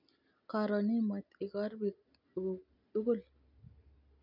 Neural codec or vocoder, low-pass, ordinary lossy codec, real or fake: none; 5.4 kHz; MP3, 48 kbps; real